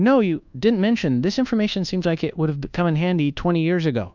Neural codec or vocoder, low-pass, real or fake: codec, 24 kHz, 1.2 kbps, DualCodec; 7.2 kHz; fake